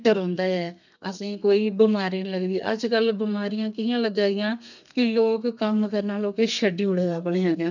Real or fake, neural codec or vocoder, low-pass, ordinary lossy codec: fake; codec, 32 kHz, 1.9 kbps, SNAC; 7.2 kHz; none